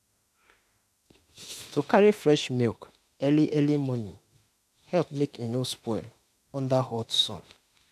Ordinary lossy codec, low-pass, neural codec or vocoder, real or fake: none; 14.4 kHz; autoencoder, 48 kHz, 32 numbers a frame, DAC-VAE, trained on Japanese speech; fake